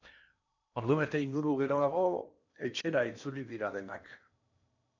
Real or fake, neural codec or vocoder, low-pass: fake; codec, 16 kHz in and 24 kHz out, 0.8 kbps, FocalCodec, streaming, 65536 codes; 7.2 kHz